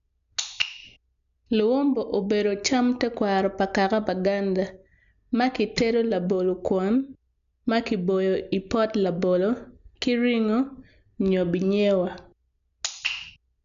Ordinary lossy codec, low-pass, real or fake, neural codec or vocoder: none; 7.2 kHz; real; none